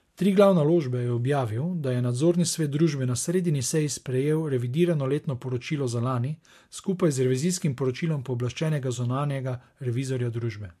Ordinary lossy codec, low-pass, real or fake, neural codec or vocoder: MP3, 64 kbps; 14.4 kHz; real; none